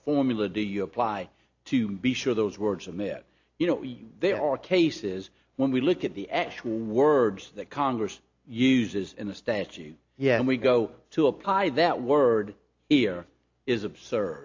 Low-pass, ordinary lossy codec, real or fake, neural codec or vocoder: 7.2 kHz; AAC, 48 kbps; real; none